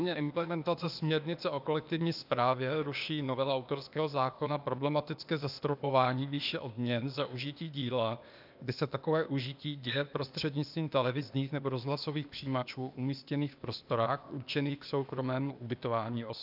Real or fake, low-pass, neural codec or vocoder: fake; 5.4 kHz; codec, 16 kHz, 0.8 kbps, ZipCodec